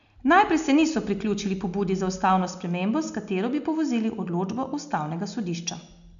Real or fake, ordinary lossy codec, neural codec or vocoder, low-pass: real; none; none; 7.2 kHz